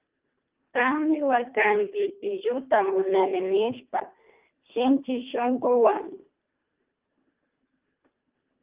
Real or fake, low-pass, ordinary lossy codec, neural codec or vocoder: fake; 3.6 kHz; Opus, 32 kbps; codec, 24 kHz, 1.5 kbps, HILCodec